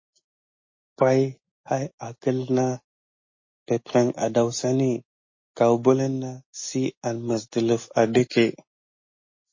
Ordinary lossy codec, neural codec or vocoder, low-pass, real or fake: MP3, 32 kbps; none; 7.2 kHz; real